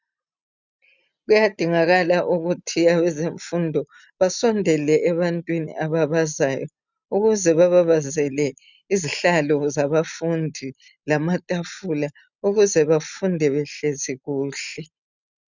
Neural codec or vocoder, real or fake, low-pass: none; real; 7.2 kHz